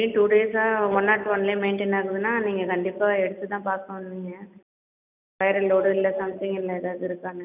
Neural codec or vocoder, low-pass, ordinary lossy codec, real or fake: none; 3.6 kHz; none; real